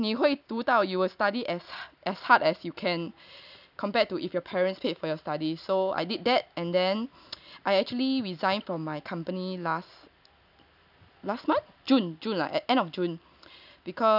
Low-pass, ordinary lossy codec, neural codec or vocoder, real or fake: 5.4 kHz; none; none; real